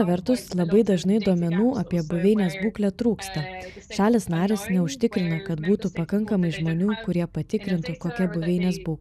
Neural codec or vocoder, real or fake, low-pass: none; real; 14.4 kHz